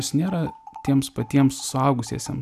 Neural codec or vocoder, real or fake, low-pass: none; real; 14.4 kHz